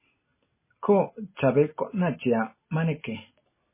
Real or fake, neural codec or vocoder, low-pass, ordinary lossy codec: real; none; 3.6 kHz; MP3, 16 kbps